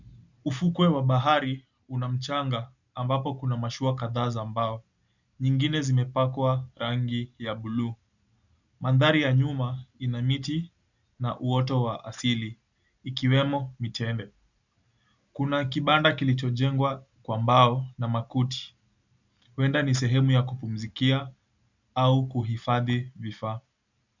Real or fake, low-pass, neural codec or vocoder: real; 7.2 kHz; none